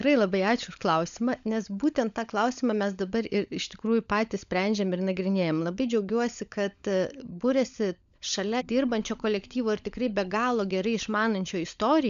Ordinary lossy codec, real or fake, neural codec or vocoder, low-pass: MP3, 96 kbps; fake; codec, 16 kHz, 16 kbps, FunCodec, trained on LibriTTS, 50 frames a second; 7.2 kHz